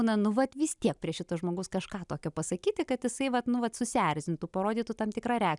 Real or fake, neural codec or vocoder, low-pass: real; none; 10.8 kHz